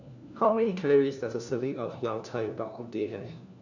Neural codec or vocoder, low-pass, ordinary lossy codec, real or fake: codec, 16 kHz, 1 kbps, FunCodec, trained on LibriTTS, 50 frames a second; 7.2 kHz; none; fake